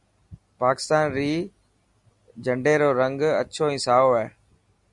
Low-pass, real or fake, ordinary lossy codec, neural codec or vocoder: 10.8 kHz; real; Opus, 64 kbps; none